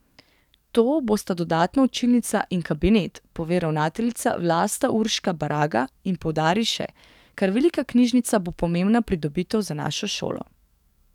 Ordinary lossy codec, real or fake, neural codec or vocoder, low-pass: none; fake; codec, 44.1 kHz, 7.8 kbps, DAC; 19.8 kHz